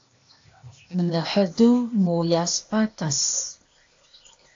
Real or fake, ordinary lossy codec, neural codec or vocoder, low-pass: fake; AAC, 48 kbps; codec, 16 kHz, 0.8 kbps, ZipCodec; 7.2 kHz